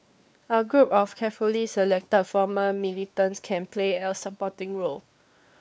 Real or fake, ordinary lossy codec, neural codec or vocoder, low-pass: fake; none; codec, 16 kHz, 2 kbps, X-Codec, WavLM features, trained on Multilingual LibriSpeech; none